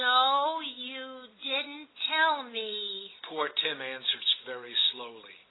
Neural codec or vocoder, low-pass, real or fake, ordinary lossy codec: none; 7.2 kHz; real; AAC, 16 kbps